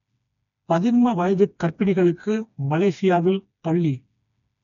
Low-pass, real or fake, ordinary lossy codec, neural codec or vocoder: 7.2 kHz; fake; none; codec, 16 kHz, 2 kbps, FreqCodec, smaller model